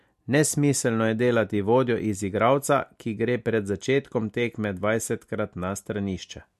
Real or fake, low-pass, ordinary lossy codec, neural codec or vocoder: real; 14.4 kHz; MP3, 64 kbps; none